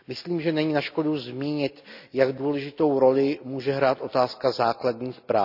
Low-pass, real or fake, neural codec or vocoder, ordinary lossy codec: 5.4 kHz; real; none; none